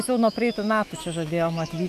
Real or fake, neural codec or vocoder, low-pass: fake; autoencoder, 48 kHz, 128 numbers a frame, DAC-VAE, trained on Japanese speech; 14.4 kHz